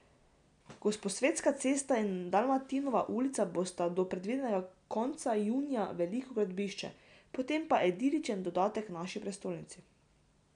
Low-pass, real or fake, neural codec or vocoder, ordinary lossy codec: 9.9 kHz; real; none; none